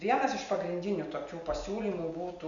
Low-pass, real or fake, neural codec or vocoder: 7.2 kHz; real; none